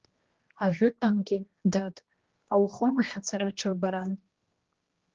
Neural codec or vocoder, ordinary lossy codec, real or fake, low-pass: codec, 16 kHz, 1 kbps, X-Codec, HuBERT features, trained on general audio; Opus, 16 kbps; fake; 7.2 kHz